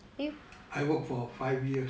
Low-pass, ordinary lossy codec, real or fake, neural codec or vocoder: none; none; real; none